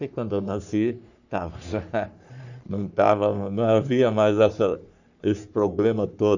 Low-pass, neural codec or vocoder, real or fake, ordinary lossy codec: 7.2 kHz; codec, 44.1 kHz, 3.4 kbps, Pupu-Codec; fake; none